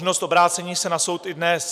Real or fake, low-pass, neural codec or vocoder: real; 14.4 kHz; none